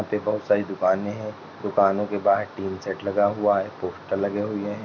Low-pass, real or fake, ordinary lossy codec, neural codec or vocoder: 7.2 kHz; real; none; none